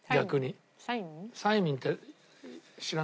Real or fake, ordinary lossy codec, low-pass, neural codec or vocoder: real; none; none; none